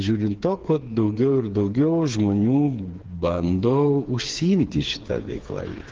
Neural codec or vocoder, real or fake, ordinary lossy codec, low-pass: codec, 16 kHz, 4 kbps, FreqCodec, smaller model; fake; Opus, 16 kbps; 7.2 kHz